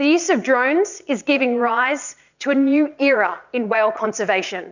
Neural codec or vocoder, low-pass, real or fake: vocoder, 22.05 kHz, 80 mel bands, Vocos; 7.2 kHz; fake